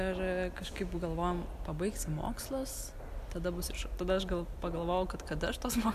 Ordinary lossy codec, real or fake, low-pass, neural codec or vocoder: AAC, 96 kbps; real; 14.4 kHz; none